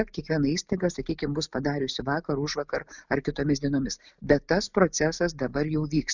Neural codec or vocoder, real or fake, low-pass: none; real; 7.2 kHz